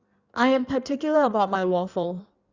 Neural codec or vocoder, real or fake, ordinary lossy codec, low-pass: codec, 16 kHz in and 24 kHz out, 1.1 kbps, FireRedTTS-2 codec; fake; Opus, 64 kbps; 7.2 kHz